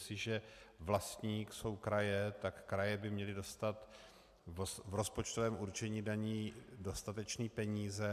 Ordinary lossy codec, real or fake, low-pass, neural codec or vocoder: AAC, 96 kbps; real; 14.4 kHz; none